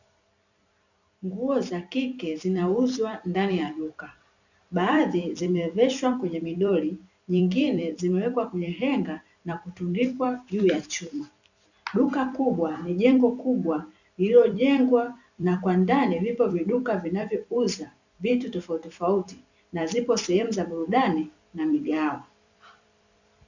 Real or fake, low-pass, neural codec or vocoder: fake; 7.2 kHz; vocoder, 44.1 kHz, 128 mel bands every 256 samples, BigVGAN v2